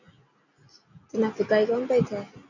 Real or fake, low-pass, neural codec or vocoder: real; 7.2 kHz; none